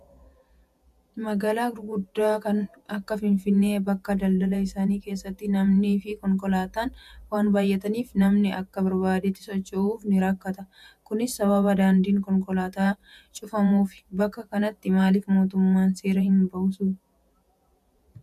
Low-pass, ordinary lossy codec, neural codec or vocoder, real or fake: 14.4 kHz; MP3, 96 kbps; vocoder, 48 kHz, 128 mel bands, Vocos; fake